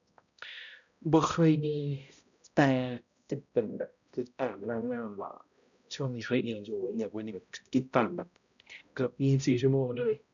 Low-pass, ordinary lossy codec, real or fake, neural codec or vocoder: 7.2 kHz; none; fake; codec, 16 kHz, 0.5 kbps, X-Codec, HuBERT features, trained on balanced general audio